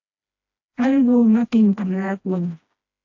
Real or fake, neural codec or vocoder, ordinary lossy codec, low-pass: fake; codec, 16 kHz, 1 kbps, FreqCodec, smaller model; AAC, 48 kbps; 7.2 kHz